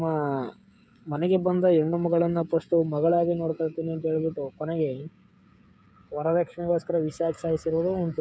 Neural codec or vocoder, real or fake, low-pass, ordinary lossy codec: codec, 16 kHz, 16 kbps, FreqCodec, smaller model; fake; none; none